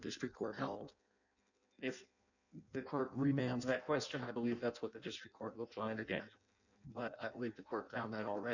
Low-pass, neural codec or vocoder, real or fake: 7.2 kHz; codec, 16 kHz in and 24 kHz out, 0.6 kbps, FireRedTTS-2 codec; fake